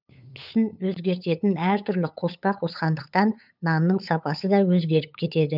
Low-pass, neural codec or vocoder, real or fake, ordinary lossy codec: 5.4 kHz; codec, 16 kHz, 8 kbps, FunCodec, trained on LibriTTS, 25 frames a second; fake; none